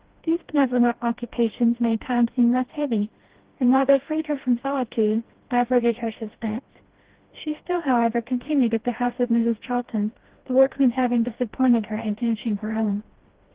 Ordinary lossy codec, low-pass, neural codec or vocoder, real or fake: Opus, 16 kbps; 3.6 kHz; codec, 16 kHz, 1 kbps, FreqCodec, smaller model; fake